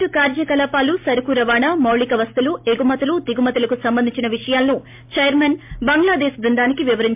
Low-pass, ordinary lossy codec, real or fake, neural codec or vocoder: 3.6 kHz; MP3, 32 kbps; real; none